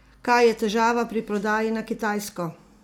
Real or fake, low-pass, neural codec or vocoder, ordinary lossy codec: real; 19.8 kHz; none; none